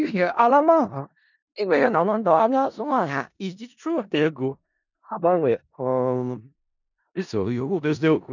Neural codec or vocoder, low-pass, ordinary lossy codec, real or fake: codec, 16 kHz in and 24 kHz out, 0.4 kbps, LongCat-Audio-Codec, four codebook decoder; 7.2 kHz; none; fake